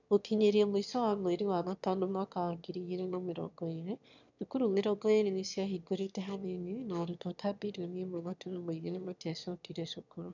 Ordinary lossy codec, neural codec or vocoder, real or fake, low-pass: none; autoencoder, 22.05 kHz, a latent of 192 numbers a frame, VITS, trained on one speaker; fake; 7.2 kHz